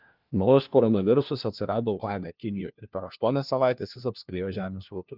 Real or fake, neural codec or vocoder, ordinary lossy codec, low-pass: fake; codec, 16 kHz, 1 kbps, FunCodec, trained on LibriTTS, 50 frames a second; Opus, 32 kbps; 5.4 kHz